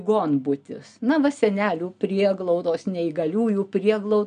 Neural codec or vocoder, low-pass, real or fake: none; 9.9 kHz; real